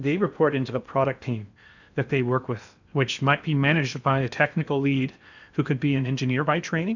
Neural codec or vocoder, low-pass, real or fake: codec, 16 kHz in and 24 kHz out, 0.8 kbps, FocalCodec, streaming, 65536 codes; 7.2 kHz; fake